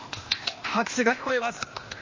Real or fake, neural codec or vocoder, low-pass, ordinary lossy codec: fake; codec, 16 kHz, 0.8 kbps, ZipCodec; 7.2 kHz; MP3, 32 kbps